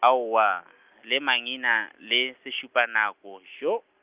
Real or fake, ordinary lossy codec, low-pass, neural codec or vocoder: real; Opus, 32 kbps; 3.6 kHz; none